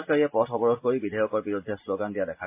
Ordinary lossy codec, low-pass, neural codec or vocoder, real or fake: MP3, 32 kbps; 3.6 kHz; none; real